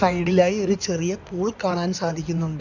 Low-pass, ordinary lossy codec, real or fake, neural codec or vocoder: 7.2 kHz; none; fake; codec, 16 kHz in and 24 kHz out, 2.2 kbps, FireRedTTS-2 codec